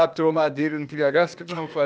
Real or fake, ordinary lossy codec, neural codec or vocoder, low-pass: fake; none; codec, 16 kHz, 0.8 kbps, ZipCodec; none